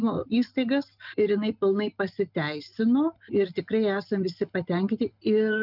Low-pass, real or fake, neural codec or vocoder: 5.4 kHz; real; none